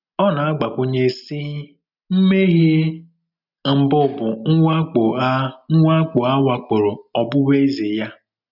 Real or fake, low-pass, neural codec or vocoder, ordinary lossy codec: real; 5.4 kHz; none; none